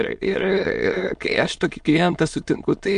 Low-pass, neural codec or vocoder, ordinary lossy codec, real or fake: 9.9 kHz; autoencoder, 22.05 kHz, a latent of 192 numbers a frame, VITS, trained on many speakers; MP3, 64 kbps; fake